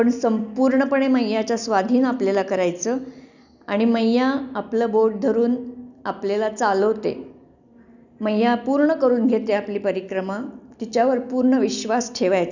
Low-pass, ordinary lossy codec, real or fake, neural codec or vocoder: 7.2 kHz; none; real; none